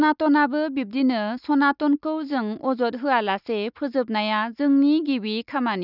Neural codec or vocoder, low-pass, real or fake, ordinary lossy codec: none; 5.4 kHz; real; none